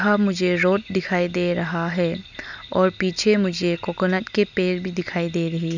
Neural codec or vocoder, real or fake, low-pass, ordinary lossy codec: none; real; 7.2 kHz; none